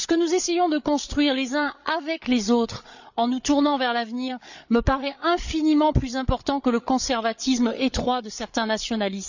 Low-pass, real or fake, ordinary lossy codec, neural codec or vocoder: 7.2 kHz; fake; none; codec, 16 kHz, 8 kbps, FreqCodec, larger model